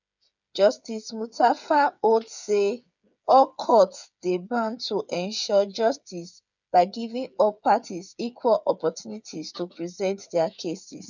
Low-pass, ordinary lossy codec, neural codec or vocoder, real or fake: 7.2 kHz; none; codec, 16 kHz, 8 kbps, FreqCodec, smaller model; fake